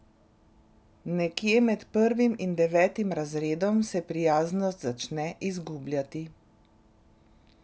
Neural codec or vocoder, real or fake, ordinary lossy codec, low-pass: none; real; none; none